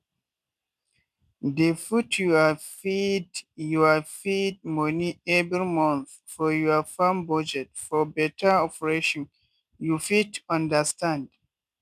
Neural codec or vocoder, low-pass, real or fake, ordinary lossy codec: none; 14.4 kHz; real; none